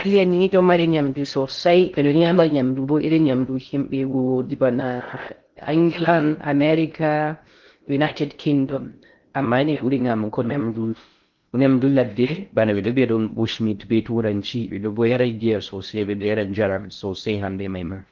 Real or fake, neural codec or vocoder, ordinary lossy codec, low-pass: fake; codec, 16 kHz in and 24 kHz out, 0.6 kbps, FocalCodec, streaming, 2048 codes; Opus, 32 kbps; 7.2 kHz